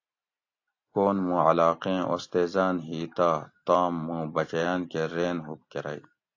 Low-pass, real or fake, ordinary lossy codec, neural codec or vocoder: 7.2 kHz; real; AAC, 48 kbps; none